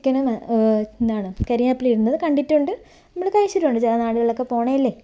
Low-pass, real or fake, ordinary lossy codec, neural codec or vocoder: none; real; none; none